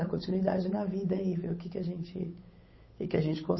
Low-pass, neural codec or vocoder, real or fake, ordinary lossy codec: 7.2 kHz; codec, 16 kHz, 8 kbps, FunCodec, trained on Chinese and English, 25 frames a second; fake; MP3, 24 kbps